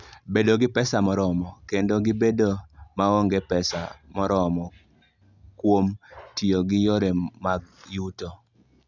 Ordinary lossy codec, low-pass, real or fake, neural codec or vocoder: none; 7.2 kHz; real; none